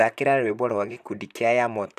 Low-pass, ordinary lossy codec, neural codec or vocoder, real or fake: 14.4 kHz; none; vocoder, 44.1 kHz, 128 mel bands, Pupu-Vocoder; fake